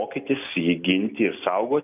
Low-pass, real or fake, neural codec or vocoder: 3.6 kHz; real; none